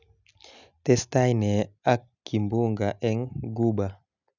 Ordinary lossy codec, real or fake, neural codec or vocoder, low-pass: none; real; none; 7.2 kHz